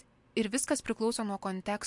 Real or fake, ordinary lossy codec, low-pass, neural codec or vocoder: real; MP3, 64 kbps; 10.8 kHz; none